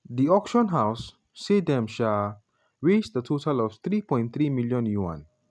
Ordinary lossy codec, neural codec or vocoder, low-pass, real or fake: none; none; none; real